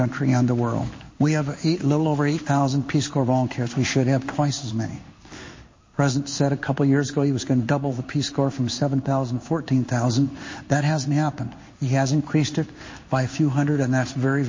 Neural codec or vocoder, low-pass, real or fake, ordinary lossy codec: codec, 16 kHz in and 24 kHz out, 1 kbps, XY-Tokenizer; 7.2 kHz; fake; MP3, 32 kbps